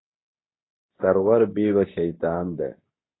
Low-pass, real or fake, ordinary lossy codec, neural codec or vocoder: 7.2 kHz; fake; AAC, 16 kbps; codec, 24 kHz, 0.9 kbps, WavTokenizer, medium speech release version 2